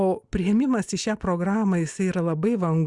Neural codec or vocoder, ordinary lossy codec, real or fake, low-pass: none; Opus, 64 kbps; real; 10.8 kHz